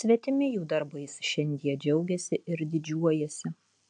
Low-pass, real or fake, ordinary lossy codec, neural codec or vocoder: 9.9 kHz; real; AAC, 64 kbps; none